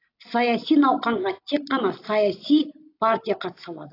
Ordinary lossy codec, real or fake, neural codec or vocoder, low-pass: AAC, 32 kbps; real; none; 5.4 kHz